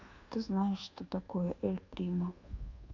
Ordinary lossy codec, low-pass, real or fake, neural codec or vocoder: AAC, 48 kbps; 7.2 kHz; fake; codec, 24 kHz, 1.2 kbps, DualCodec